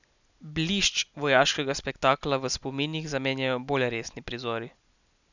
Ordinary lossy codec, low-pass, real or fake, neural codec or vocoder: none; 7.2 kHz; real; none